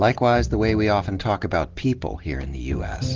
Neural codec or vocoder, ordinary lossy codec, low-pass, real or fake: none; Opus, 16 kbps; 7.2 kHz; real